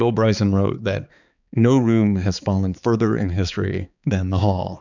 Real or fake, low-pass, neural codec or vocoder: fake; 7.2 kHz; codec, 16 kHz, 4 kbps, X-Codec, HuBERT features, trained on balanced general audio